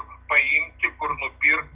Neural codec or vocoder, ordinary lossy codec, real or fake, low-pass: none; Opus, 24 kbps; real; 3.6 kHz